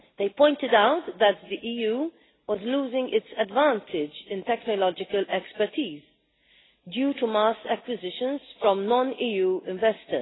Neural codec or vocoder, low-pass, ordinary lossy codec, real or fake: none; 7.2 kHz; AAC, 16 kbps; real